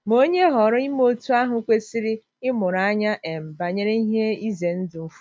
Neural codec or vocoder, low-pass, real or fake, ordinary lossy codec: none; none; real; none